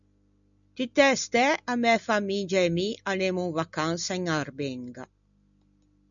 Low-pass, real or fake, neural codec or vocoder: 7.2 kHz; real; none